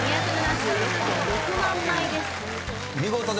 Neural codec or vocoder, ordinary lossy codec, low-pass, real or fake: none; none; none; real